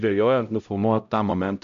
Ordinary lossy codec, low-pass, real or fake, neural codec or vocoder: AAC, 64 kbps; 7.2 kHz; fake; codec, 16 kHz, 0.5 kbps, X-Codec, HuBERT features, trained on LibriSpeech